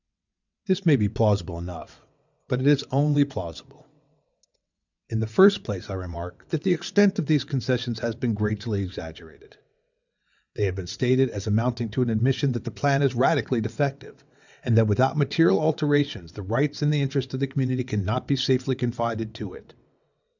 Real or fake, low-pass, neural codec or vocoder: fake; 7.2 kHz; vocoder, 22.05 kHz, 80 mel bands, WaveNeXt